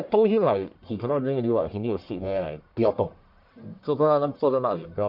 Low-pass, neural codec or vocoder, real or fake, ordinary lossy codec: 5.4 kHz; codec, 44.1 kHz, 1.7 kbps, Pupu-Codec; fake; MP3, 48 kbps